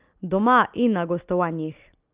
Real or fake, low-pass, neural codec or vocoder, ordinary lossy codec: real; 3.6 kHz; none; Opus, 24 kbps